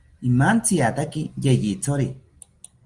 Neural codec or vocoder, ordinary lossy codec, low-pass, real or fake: none; Opus, 24 kbps; 10.8 kHz; real